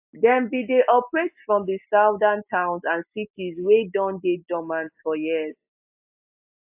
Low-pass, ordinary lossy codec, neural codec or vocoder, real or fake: 3.6 kHz; AAC, 32 kbps; none; real